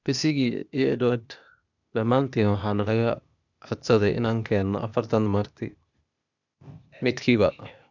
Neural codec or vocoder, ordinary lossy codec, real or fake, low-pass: codec, 16 kHz, 0.8 kbps, ZipCodec; none; fake; 7.2 kHz